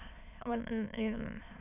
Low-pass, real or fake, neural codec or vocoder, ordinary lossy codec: 3.6 kHz; fake; autoencoder, 22.05 kHz, a latent of 192 numbers a frame, VITS, trained on many speakers; none